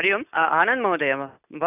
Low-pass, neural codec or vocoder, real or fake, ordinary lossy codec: 3.6 kHz; none; real; none